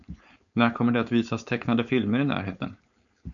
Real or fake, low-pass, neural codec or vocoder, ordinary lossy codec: fake; 7.2 kHz; codec, 16 kHz, 4.8 kbps, FACodec; MP3, 96 kbps